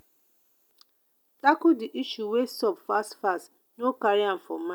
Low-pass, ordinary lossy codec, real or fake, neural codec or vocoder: none; none; real; none